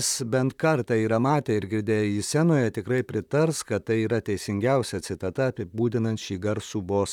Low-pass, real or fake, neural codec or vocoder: 19.8 kHz; fake; vocoder, 44.1 kHz, 128 mel bands, Pupu-Vocoder